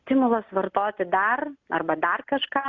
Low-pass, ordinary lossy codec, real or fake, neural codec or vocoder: 7.2 kHz; Opus, 64 kbps; real; none